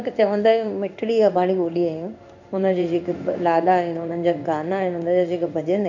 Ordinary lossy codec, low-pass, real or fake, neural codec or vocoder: none; 7.2 kHz; fake; codec, 16 kHz in and 24 kHz out, 1 kbps, XY-Tokenizer